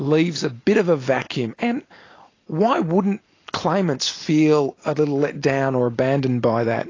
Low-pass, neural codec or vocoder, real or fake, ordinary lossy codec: 7.2 kHz; none; real; AAC, 32 kbps